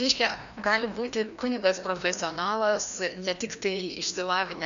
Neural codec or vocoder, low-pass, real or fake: codec, 16 kHz, 1 kbps, FreqCodec, larger model; 7.2 kHz; fake